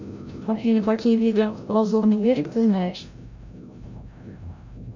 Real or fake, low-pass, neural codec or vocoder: fake; 7.2 kHz; codec, 16 kHz, 0.5 kbps, FreqCodec, larger model